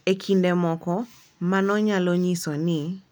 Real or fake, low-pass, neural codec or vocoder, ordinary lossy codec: real; none; none; none